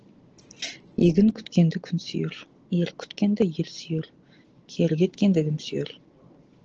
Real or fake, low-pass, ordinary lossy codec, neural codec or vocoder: real; 7.2 kHz; Opus, 16 kbps; none